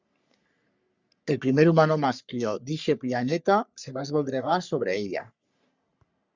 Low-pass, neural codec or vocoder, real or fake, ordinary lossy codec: 7.2 kHz; codec, 44.1 kHz, 3.4 kbps, Pupu-Codec; fake; Opus, 64 kbps